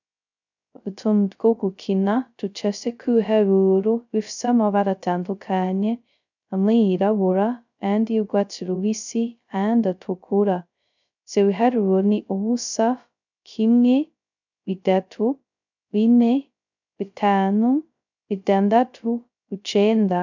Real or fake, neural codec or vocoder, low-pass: fake; codec, 16 kHz, 0.2 kbps, FocalCodec; 7.2 kHz